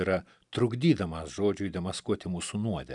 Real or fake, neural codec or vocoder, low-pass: real; none; 10.8 kHz